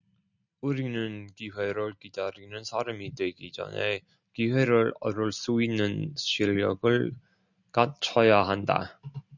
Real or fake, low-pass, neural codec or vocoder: real; 7.2 kHz; none